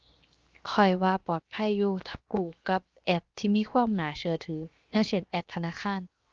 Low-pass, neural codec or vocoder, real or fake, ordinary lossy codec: 7.2 kHz; codec, 16 kHz, 0.7 kbps, FocalCodec; fake; Opus, 32 kbps